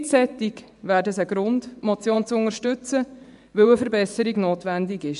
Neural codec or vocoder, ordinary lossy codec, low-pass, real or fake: vocoder, 24 kHz, 100 mel bands, Vocos; none; 10.8 kHz; fake